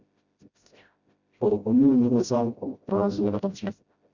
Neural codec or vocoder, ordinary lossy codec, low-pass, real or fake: codec, 16 kHz, 0.5 kbps, FreqCodec, smaller model; Opus, 64 kbps; 7.2 kHz; fake